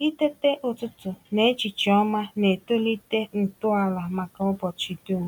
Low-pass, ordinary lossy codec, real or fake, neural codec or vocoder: 19.8 kHz; none; real; none